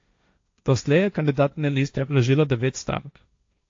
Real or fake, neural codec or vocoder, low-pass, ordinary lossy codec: fake; codec, 16 kHz, 1.1 kbps, Voila-Tokenizer; 7.2 kHz; AAC, 48 kbps